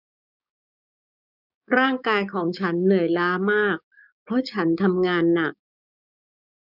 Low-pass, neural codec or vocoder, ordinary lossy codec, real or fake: 5.4 kHz; codec, 16 kHz, 6 kbps, DAC; none; fake